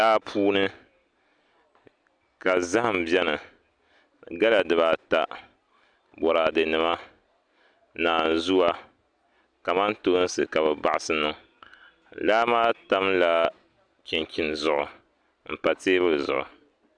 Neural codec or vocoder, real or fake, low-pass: none; real; 9.9 kHz